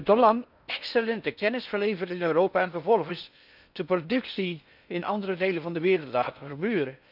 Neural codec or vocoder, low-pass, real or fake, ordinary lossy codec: codec, 16 kHz in and 24 kHz out, 0.6 kbps, FocalCodec, streaming, 4096 codes; 5.4 kHz; fake; none